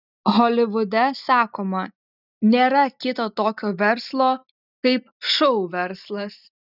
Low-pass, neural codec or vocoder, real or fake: 5.4 kHz; none; real